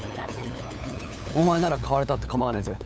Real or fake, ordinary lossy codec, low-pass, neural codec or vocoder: fake; none; none; codec, 16 kHz, 8 kbps, FunCodec, trained on LibriTTS, 25 frames a second